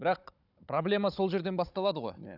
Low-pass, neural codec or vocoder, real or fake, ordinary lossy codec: 5.4 kHz; codec, 16 kHz, 16 kbps, FunCodec, trained on Chinese and English, 50 frames a second; fake; none